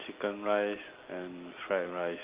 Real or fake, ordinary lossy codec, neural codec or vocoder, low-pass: real; Opus, 32 kbps; none; 3.6 kHz